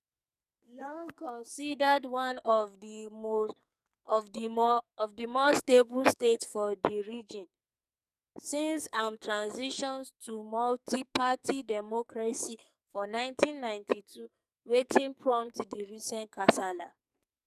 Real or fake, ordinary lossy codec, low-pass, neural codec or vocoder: fake; none; 14.4 kHz; codec, 44.1 kHz, 2.6 kbps, SNAC